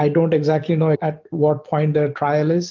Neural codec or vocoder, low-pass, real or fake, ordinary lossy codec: none; 7.2 kHz; real; Opus, 24 kbps